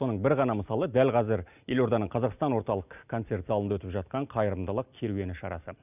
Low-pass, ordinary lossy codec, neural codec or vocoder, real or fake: 3.6 kHz; none; none; real